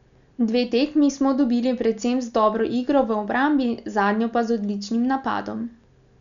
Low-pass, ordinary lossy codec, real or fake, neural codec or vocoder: 7.2 kHz; none; real; none